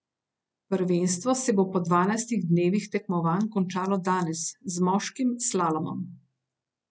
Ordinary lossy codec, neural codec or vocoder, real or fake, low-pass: none; none; real; none